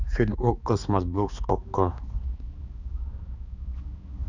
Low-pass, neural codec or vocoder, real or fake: 7.2 kHz; codec, 16 kHz, 2 kbps, X-Codec, HuBERT features, trained on balanced general audio; fake